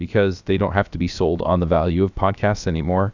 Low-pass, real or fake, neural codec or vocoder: 7.2 kHz; fake; codec, 16 kHz, about 1 kbps, DyCAST, with the encoder's durations